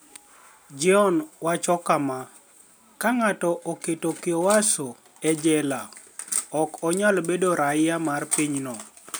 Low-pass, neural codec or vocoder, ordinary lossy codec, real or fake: none; none; none; real